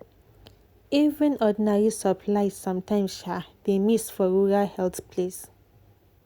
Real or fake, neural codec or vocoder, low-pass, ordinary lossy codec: real; none; 19.8 kHz; Opus, 64 kbps